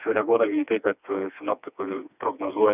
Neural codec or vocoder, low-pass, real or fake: codec, 16 kHz, 2 kbps, FreqCodec, smaller model; 3.6 kHz; fake